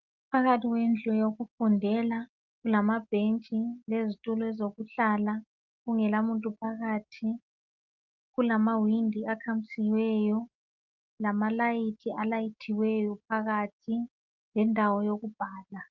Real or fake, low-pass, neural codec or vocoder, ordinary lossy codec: real; 7.2 kHz; none; Opus, 32 kbps